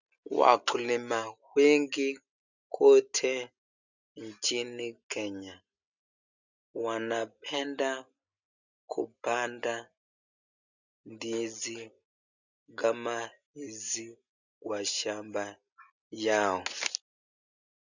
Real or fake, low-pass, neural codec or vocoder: real; 7.2 kHz; none